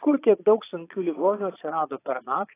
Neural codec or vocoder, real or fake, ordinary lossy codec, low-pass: vocoder, 22.05 kHz, 80 mel bands, Vocos; fake; AAC, 16 kbps; 3.6 kHz